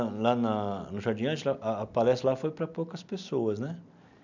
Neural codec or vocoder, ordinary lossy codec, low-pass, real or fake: none; none; 7.2 kHz; real